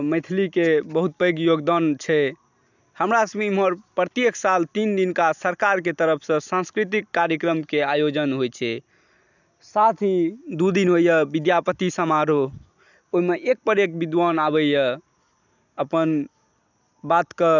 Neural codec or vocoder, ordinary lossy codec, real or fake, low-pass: none; none; real; 7.2 kHz